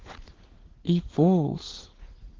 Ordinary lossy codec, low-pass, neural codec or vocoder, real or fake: Opus, 16 kbps; 7.2 kHz; codec, 16 kHz, 4 kbps, FunCodec, trained on LibriTTS, 50 frames a second; fake